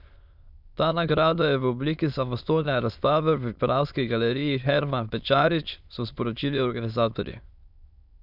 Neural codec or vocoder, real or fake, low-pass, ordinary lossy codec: autoencoder, 22.05 kHz, a latent of 192 numbers a frame, VITS, trained on many speakers; fake; 5.4 kHz; none